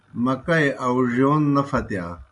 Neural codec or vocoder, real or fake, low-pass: none; real; 10.8 kHz